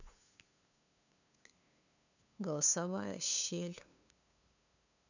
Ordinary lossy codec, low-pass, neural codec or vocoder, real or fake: none; 7.2 kHz; codec, 16 kHz, 2 kbps, FunCodec, trained on LibriTTS, 25 frames a second; fake